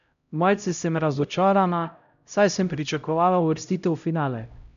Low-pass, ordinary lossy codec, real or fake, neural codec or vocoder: 7.2 kHz; none; fake; codec, 16 kHz, 0.5 kbps, X-Codec, HuBERT features, trained on LibriSpeech